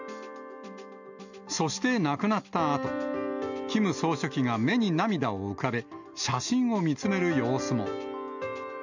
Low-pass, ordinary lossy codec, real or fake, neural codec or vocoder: 7.2 kHz; none; real; none